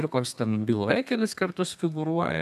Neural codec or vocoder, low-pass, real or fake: codec, 32 kHz, 1.9 kbps, SNAC; 14.4 kHz; fake